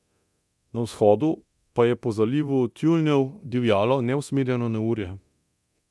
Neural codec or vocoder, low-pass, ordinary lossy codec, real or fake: codec, 24 kHz, 0.9 kbps, DualCodec; none; none; fake